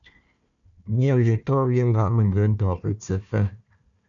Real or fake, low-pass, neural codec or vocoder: fake; 7.2 kHz; codec, 16 kHz, 1 kbps, FunCodec, trained on Chinese and English, 50 frames a second